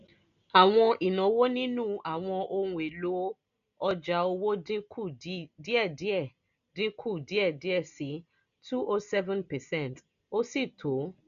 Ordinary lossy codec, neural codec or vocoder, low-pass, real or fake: none; none; 7.2 kHz; real